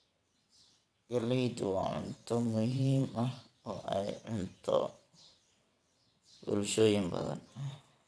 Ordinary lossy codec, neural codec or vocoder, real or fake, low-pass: none; vocoder, 22.05 kHz, 80 mel bands, WaveNeXt; fake; none